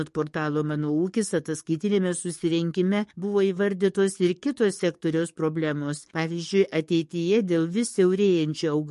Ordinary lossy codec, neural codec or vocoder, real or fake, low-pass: MP3, 48 kbps; codec, 44.1 kHz, 7.8 kbps, DAC; fake; 14.4 kHz